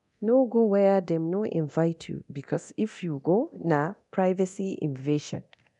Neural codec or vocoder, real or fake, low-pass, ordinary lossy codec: codec, 24 kHz, 0.9 kbps, DualCodec; fake; 10.8 kHz; none